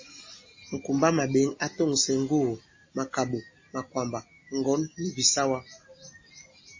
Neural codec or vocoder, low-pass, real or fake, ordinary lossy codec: none; 7.2 kHz; real; MP3, 32 kbps